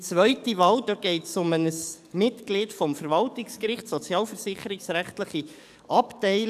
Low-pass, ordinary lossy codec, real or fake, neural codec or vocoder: 14.4 kHz; none; fake; codec, 44.1 kHz, 7.8 kbps, DAC